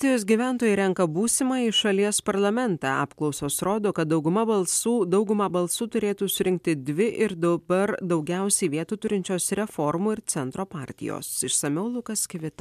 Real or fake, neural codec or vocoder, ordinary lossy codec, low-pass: real; none; MP3, 96 kbps; 14.4 kHz